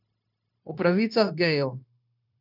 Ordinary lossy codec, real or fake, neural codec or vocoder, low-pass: none; fake; codec, 16 kHz, 0.9 kbps, LongCat-Audio-Codec; 5.4 kHz